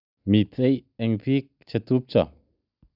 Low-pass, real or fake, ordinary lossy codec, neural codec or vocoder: 5.4 kHz; real; none; none